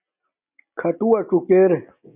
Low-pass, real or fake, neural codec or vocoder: 3.6 kHz; real; none